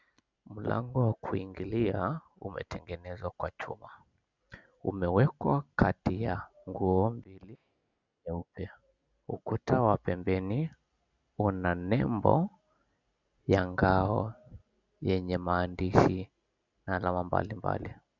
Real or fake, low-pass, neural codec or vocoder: real; 7.2 kHz; none